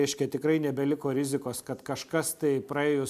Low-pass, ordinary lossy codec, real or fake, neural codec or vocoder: 14.4 kHz; MP3, 96 kbps; real; none